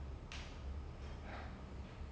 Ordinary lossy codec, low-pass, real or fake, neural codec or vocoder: none; none; real; none